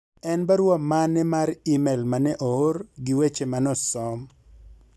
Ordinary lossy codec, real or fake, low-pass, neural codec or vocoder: none; real; none; none